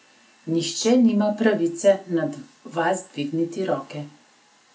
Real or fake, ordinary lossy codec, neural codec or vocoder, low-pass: real; none; none; none